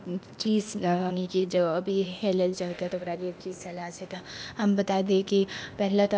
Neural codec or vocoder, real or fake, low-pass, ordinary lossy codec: codec, 16 kHz, 0.8 kbps, ZipCodec; fake; none; none